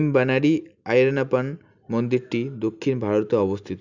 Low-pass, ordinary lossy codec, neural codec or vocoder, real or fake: 7.2 kHz; none; none; real